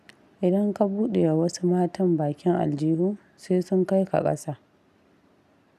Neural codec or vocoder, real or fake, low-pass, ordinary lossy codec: none; real; 14.4 kHz; none